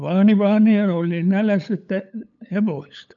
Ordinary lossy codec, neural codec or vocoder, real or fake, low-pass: none; codec, 16 kHz, 8 kbps, FunCodec, trained on LibriTTS, 25 frames a second; fake; 7.2 kHz